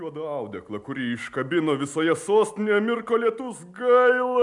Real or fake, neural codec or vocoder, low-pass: real; none; 10.8 kHz